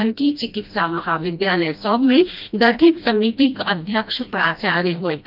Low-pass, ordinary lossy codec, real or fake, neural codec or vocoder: 5.4 kHz; none; fake; codec, 16 kHz, 1 kbps, FreqCodec, smaller model